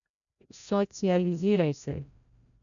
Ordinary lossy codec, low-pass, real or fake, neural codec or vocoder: none; 7.2 kHz; fake; codec, 16 kHz, 0.5 kbps, FreqCodec, larger model